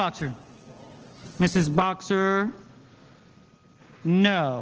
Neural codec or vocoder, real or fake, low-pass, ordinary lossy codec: none; real; 7.2 kHz; Opus, 16 kbps